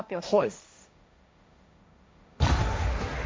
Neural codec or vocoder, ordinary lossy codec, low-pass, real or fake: codec, 16 kHz, 1.1 kbps, Voila-Tokenizer; none; none; fake